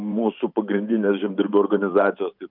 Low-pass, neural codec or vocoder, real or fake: 5.4 kHz; vocoder, 44.1 kHz, 128 mel bands every 512 samples, BigVGAN v2; fake